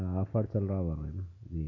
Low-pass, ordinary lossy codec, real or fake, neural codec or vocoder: 7.2 kHz; none; real; none